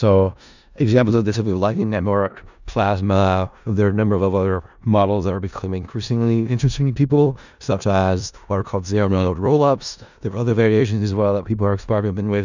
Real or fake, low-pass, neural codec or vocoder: fake; 7.2 kHz; codec, 16 kHz in and 24 kHz out, 0.4 kbps, LongCat-Audio-Codec, four codebook decoder